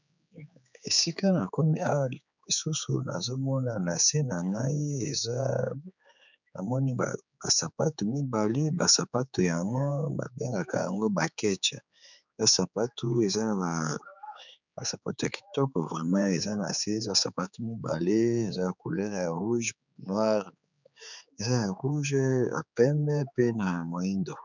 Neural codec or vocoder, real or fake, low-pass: codec, 16 kHz, 4 kbps, X-Codec, HuBERT features, trained on general audio; fake; 7.2 kHz